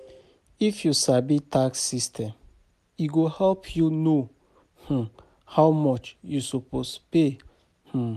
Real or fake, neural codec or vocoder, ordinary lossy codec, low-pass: real; none; none; 14.4 kHz